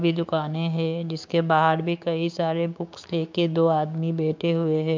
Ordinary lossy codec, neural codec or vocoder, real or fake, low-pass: MP3, 64 kbps; codec, 16 kHz, 6 kbps, DAC; fake; 7.2 kHz